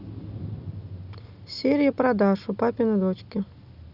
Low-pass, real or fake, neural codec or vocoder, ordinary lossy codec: 5.4 kHz; real; none; Opus, 64 kbps